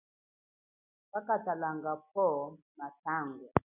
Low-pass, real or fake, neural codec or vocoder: 3.6 kHz; real; none